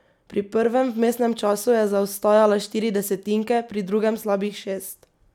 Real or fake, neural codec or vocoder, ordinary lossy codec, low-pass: real; none; none; 19.8 kHz